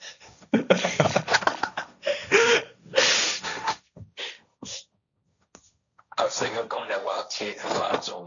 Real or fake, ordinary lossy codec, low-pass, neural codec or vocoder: fake; MP3, 96 kbps; 7.2 kHz; codec, 16 kHz, 1.1 kbps, Voila-Tokenizer